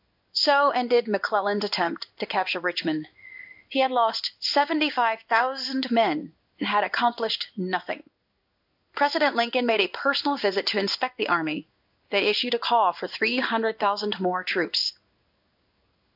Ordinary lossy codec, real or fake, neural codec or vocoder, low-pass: AAC, 48 kbps; fake; codec, 16 kHz in and 24 kHz out, 1 kbps, XY-Tokenizer; 5.4 kHz